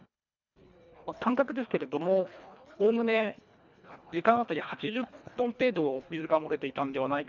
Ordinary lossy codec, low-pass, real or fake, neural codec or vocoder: none; 7.2 kHz; fake; codec, 24 kHz, 1.5 kbps, HILCodec